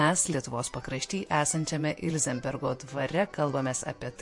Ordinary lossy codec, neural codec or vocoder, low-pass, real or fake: MP3, 48 kbps; vocoder, 48 kHz, 128 mel bands, Vocos; 10.8 kHz; fake